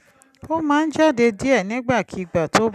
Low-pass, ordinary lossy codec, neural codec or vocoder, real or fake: 14.4 kHz; none; none; real